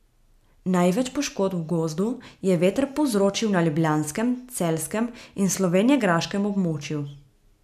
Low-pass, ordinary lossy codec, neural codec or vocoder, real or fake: 14.4 kHz; none; none; real